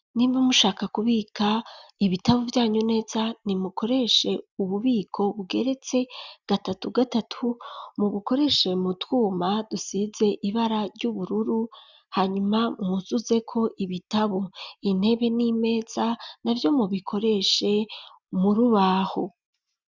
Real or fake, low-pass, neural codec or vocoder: real; 7.2 kHz; none